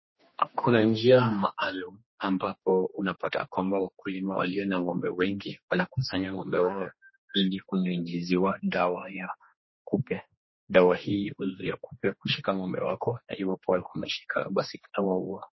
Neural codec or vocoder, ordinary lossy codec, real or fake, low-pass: codec, 16 kHz, 2 kbps, X-Codec, HuBERT features, trained on general audio; MP3, 24 kbps; fake; 7.2 kHz